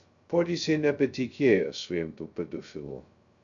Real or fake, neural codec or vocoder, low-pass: fake; codec, 16 kHz, 0.2 kbps, FocalCodec; 7.2 kHz